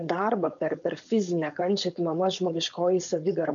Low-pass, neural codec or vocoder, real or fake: 7.2 kHz; codec, 16 kHz, 4.8 kbps, FACodec; fake